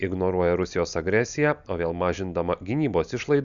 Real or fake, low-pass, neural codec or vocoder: real; 7.2 kHz; none